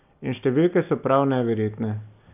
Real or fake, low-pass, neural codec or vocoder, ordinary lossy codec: real; 3.6 kHz; none; none